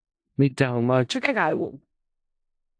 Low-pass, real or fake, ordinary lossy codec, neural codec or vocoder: 9.9 kHz; fake; AAC, 64 kbps; codec, 16 kHz in and 24 kHz out, 0.4 kbps, LongCat-Audio-Codec, four codebook decoder